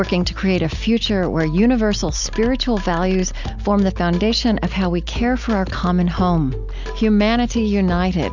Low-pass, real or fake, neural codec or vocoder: 7.2 kHz; real; none